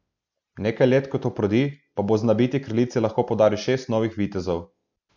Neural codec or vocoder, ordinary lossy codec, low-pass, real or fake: none; none; 7.2 kHz; real